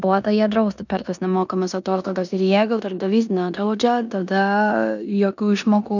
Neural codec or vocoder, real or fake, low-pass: codec, 16 kHz in and 24 kHz out, 0.9 kbps, LongCat-Audio-Codec, four codebook decoder; fake; 7.2 kHz